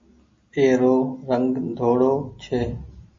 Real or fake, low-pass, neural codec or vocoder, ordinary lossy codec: real; 7.2 kHz; none; MP3, 32 kbps